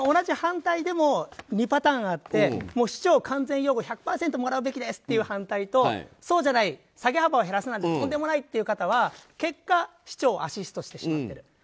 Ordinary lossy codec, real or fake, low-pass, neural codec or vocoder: none; real; none; none